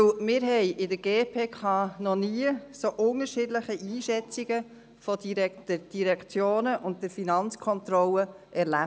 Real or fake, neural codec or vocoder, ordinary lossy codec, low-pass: real; none; none; none